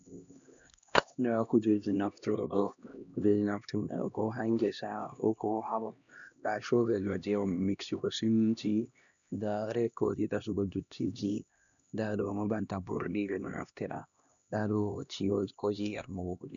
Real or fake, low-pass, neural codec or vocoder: fake; 7.2 kHz; codec, 16 kHz, 1 kbps, X-Codec, HuBERT features, trained on LibriSpeech